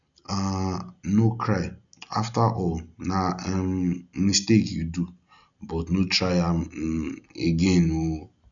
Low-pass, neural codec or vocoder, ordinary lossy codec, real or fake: 7.2 kHz; none; none; real